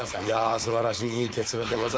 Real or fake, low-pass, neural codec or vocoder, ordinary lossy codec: fake; none; codec, 16 kHz, 4.8 kbps, FACodec; none